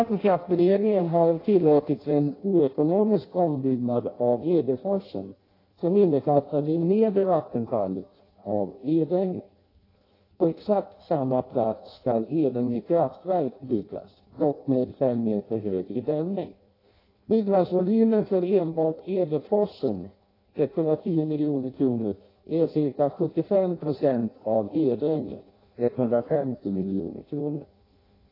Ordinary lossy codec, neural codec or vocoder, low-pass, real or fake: AAC, 24 kbps; codec, 16 kHz in and 24 kHz out, 0.6 kbps, FireRedTTS-2 codec; 5.4 kHz; fake